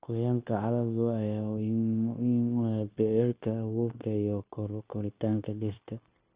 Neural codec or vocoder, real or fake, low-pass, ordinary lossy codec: codec, 16 kHz, 0.9 kbps, LongCat-Audio-Codec; fake; 3.6 kHz; Opus, 24 kbps